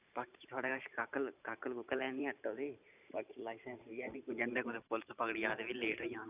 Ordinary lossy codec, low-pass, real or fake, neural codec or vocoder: none; 3.6 kHz; fake; vocoder, 44.1 kHz, 128 mel bands every 512 samples, BigVGAN v2